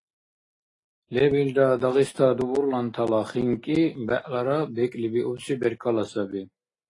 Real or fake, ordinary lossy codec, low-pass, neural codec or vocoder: real; AAC, 32 kbps; 10.8 kHz; none